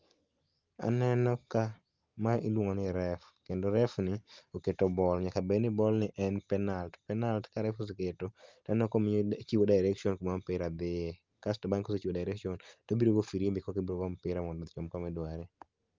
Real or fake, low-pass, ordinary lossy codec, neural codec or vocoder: real; 7.2 kHz; Opus, 32 kbps; none